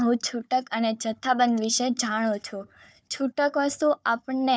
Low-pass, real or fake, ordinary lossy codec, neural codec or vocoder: none; fake; none; codec, 16 kHz, 4 kbps, FunCodec, trained on Chinese and English, 50 frames a second